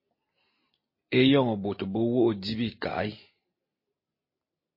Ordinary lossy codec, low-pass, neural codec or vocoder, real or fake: MP3, 24 kbps; 5.4 kHz; none; real